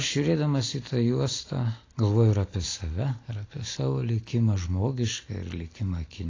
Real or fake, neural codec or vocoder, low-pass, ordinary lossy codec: real; none; 7.2 kHz; AAC, 32 kbps